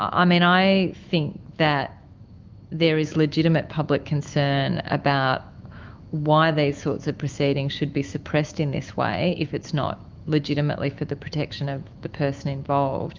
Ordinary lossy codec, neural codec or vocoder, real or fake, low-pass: Opus, 24 kbps; autoencoder, 48 kHz, 128 numbers a frame, DAC-VAE, trained on Japanese speech; fake; 7.2 kHz